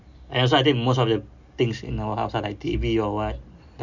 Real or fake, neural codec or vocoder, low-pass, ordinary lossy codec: real; none; 7.2 kHz; none